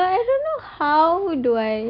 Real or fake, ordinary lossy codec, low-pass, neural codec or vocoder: real; AAC, 48 kbps; 5.4 kHz; none